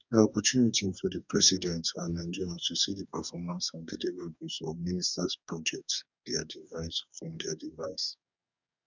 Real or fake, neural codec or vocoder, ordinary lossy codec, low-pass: fake; codec, 44.1 kHz, 2.6 kbps, DAC; none; 7.2 kHz